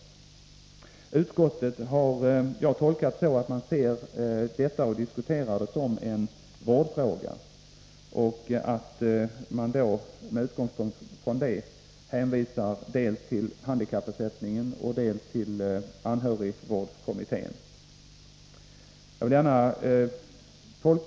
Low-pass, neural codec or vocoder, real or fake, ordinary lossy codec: none; none; real; none